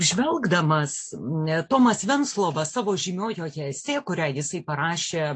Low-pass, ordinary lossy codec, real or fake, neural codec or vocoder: 9.9 kHz; AAC, 48 kbps; real; none